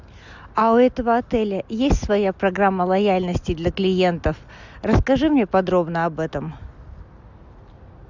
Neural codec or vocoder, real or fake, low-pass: none; real; 7.2 kHz